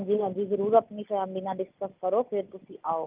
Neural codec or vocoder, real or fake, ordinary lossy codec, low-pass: none; real; Opus, 24 kbps; 3.6 kHz